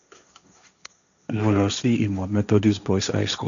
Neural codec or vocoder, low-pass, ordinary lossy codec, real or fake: codec, 16 kHz, 1.1 kbps, Voila-Tokenizer; 7.2 kHz; none; fake